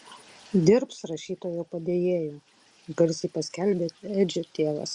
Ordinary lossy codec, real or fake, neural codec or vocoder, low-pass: Opus, 64 kbps; real; none; 10.8 kHz